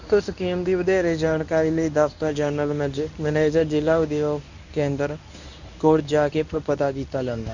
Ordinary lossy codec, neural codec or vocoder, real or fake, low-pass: none; codec, 24 kHz, 0.9 kbps, WavTokenizer, medium speech release version 1; fake; 7.2 kHz